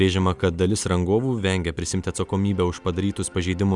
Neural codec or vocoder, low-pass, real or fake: none; 10.8 kHz; real